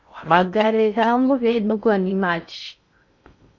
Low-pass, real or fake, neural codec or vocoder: 7.2 kHz; fake; codec, 16 kHz in and 24 kHz out, 0.6 kbps, FocalCodec, streaming, 4096 codes